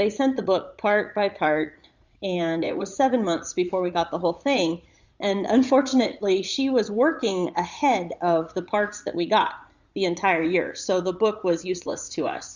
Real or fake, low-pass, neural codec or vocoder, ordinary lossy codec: fake; 7.2 kHz; vocoder, 44.1 kHz, 80 mel bands, Vocos; Opus, 64 kbps